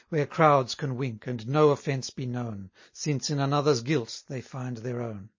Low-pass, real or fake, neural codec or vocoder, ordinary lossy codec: 7.2 kHz; real; none; MP3, 32 kbps